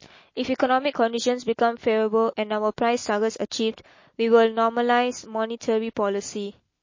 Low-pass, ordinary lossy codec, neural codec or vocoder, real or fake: 7.2 kHz; MP3, 32 kbps; none; real